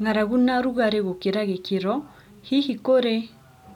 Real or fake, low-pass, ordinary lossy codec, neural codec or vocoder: real; 19.8 kHz; none; none